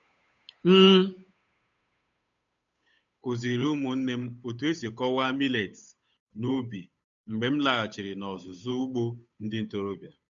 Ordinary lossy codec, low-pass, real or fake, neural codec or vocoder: none; 7.2 kHz; fake; codec, 16 kHz, 8 kbps, FunCodec, trained on Chinese and English, 25 frames a second